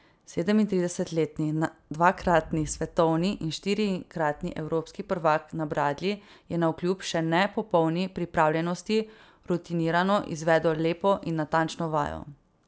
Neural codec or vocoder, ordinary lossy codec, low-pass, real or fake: none; none; none; real